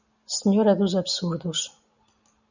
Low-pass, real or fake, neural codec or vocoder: 7.2 kHz; real; none